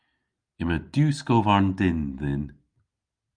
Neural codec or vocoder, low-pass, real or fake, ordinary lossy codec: none; 9.9 kHz; real; Opus, 32 kbps